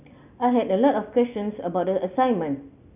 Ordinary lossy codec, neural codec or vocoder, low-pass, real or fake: none; none; 3.6 kHz; real